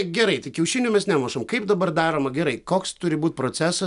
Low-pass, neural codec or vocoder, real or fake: 10.8 kHz; none; real